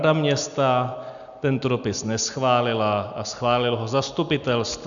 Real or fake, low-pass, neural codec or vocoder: real; 7.2 kHz; none